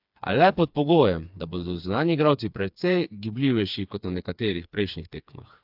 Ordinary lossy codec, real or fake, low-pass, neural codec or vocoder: AAC, 48 kbps; fake; 5.4 kHz; codec, 16 kHz, 4 kbps, FreqCodec, smaller model